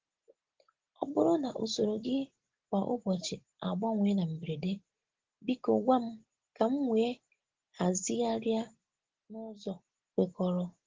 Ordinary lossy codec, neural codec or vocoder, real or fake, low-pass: Opus, 16 kbps; none; real; 7.2 kHz